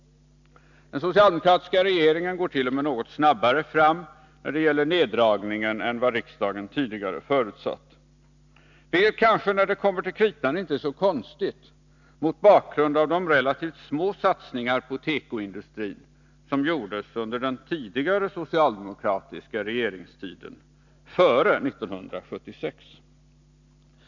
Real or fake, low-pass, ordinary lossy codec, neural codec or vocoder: real; 7.2 kHz; none; none